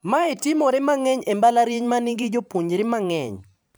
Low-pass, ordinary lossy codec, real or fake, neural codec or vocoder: none; none; fake; vocoder, 44.1 kHz, 128 mel bands every 512 samples, BigVGAN v2